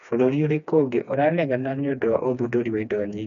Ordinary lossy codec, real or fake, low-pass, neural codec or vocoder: none; fake; 7.2 kHz; codec, 16 kHz, 2 kbps, FreqCodec, smaller model